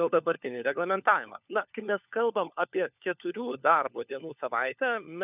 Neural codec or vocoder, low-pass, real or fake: codec, 16 kHz, 4 kbps, FunCodec, trained on LibriTTS, 50 frames a second; 3.6 kHz; fake